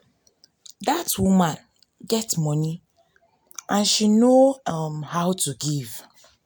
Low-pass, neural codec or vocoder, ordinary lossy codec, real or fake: none; none; none; real